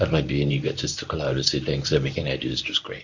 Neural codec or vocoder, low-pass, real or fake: codec, 24 kHz, 0.9 kbps, WavTokenizer, medium speech release version 1; 7.2 kHz; fake